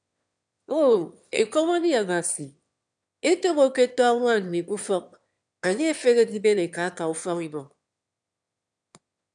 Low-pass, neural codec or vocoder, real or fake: 9.9 kHz; autoencoder, 22.05 kHz, a latent of 192 numbers a frame, VITS, trained on one speaker; fake